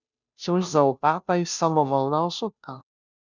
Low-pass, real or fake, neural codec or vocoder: 7.2 kHz; fake; codec, 16 kHz, 0.5 kbps, FunCodec, trained on Chinese and English, 25 frames a second